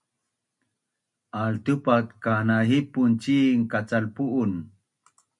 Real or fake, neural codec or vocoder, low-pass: real; none; 10.8 kHz